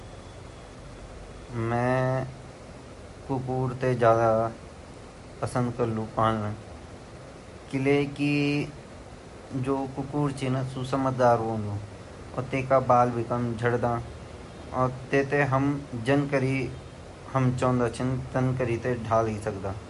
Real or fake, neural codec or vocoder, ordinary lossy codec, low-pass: real; none; MP3, 48 kbps; 14.4 kHz